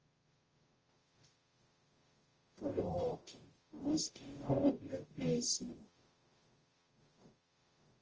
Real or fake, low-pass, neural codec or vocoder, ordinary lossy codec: fake; 7.2 kHz; codec, 44.1 kHz, 0.9 kbps, DAC; Opus, 24 kbps